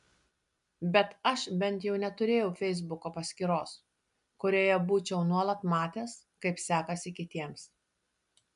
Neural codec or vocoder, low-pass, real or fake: none; 10.8 kHz; real